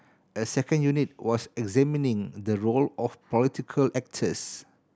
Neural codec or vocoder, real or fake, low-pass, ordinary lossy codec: none; real; none; none